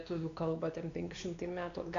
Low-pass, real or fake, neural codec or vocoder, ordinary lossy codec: 7.2 kHz; fake; codec, 16 kHz, 2 kbps, X-Codec, WavLM features, trained on Multilingual LibriSpeech; MP3, 64 kbps